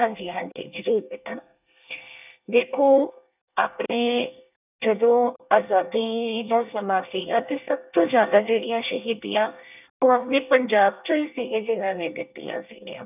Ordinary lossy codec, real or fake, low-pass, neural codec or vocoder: none; fake; 3.6 kHz; codec, 24 kHz, 1 kbps, SNAC